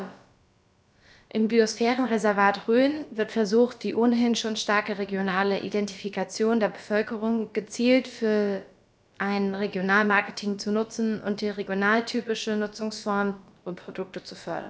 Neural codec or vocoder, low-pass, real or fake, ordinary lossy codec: codec, 16 kHz, about 1 kbps, DyCAST, with the encoder's durations; none; fake; none